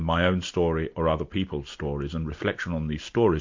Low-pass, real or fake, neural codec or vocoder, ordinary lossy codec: 7.2 kHz; real; none; MP3, 48 kbps